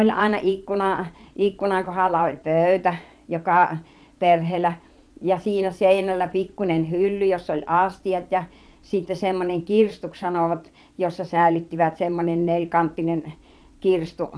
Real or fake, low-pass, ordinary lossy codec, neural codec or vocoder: fake; none; none; vocoder, 22.05 kHz, 80 mel bands, Vocos